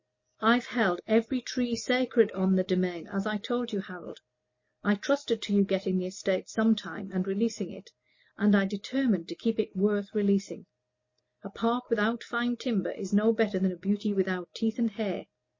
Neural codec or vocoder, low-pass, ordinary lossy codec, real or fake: none; 7.2 kHz; MP3, 32 kbps; real